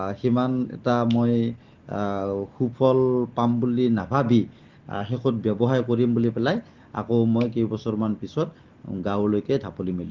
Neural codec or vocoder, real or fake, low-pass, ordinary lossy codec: none; real; 7.2 kHz; Opus, 16 kbps